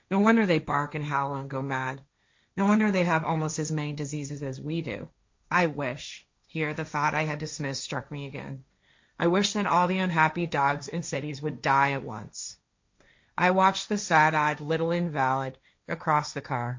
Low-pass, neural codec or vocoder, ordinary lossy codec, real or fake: 7.2 kHz; codec, 16 kHz, 1.1 kbps, Voila-Tokenizer; MP3, 48 kbps; fake